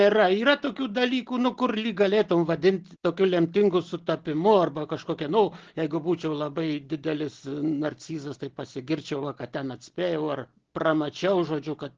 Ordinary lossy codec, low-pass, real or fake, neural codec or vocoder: Opus, 16 kbps; 7.2 kHz; real; none